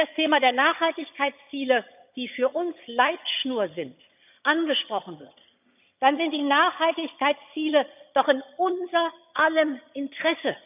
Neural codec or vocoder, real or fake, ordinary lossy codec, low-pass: vocoder, 22.05 kHz, 80 mel bands, HiFi-GAN; fake; none; 3.6 kHz